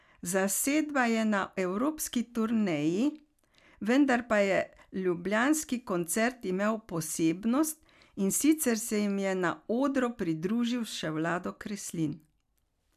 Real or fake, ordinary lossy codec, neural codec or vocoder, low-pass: real; none; none; 14.4 kHz